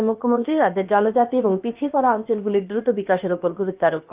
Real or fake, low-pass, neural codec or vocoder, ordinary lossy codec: fake; 3.6 kHz; codec, 16 kHz, about 1 kbps, DyCAST, with the encoder's durations; Opus, 32 kbps